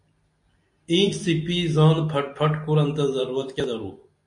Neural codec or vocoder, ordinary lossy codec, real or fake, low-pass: none; MP3, 48 kbps; real; 10.8 kHz